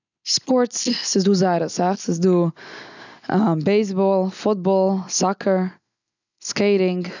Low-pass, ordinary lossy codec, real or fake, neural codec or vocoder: 7.2 kHz; none; real; none